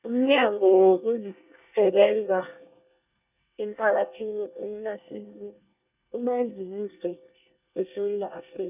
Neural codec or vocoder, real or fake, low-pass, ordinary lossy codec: codec, 24 kHz, 1 kbps, SNAC; fake; 3.6 kHz; none